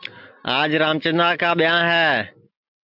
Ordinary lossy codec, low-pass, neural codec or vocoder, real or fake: MP3, 48 kbps; 5.4 kHz; none; real